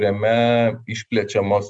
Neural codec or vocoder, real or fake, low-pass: none; real; 9.9 kHz